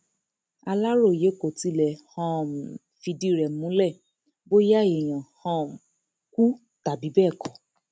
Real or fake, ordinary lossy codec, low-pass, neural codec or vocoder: real; none; none; none